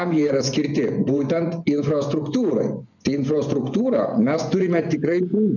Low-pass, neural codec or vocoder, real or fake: 7.2 kHz; vocoder, 24 kHz, 100 mel bands, Vocos; fake